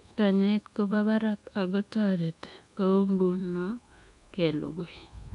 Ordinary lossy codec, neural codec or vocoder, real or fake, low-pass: none; codec, 24 kHz, 1.2 kbps, DualCodec; fake; 10.8 kHz